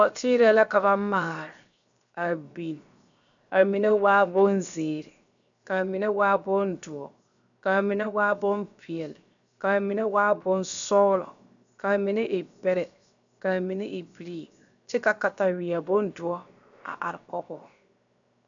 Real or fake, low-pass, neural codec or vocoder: fake; 7.2 kHz; codec, 16 kHz, 0.7 kbps, FocalCodec